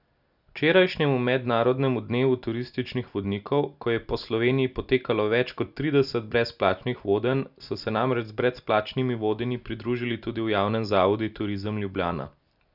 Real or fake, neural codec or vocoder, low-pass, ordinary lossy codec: real; none; 5.4 kHz; none